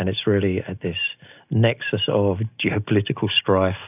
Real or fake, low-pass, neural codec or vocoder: real; 3.6 kHz; none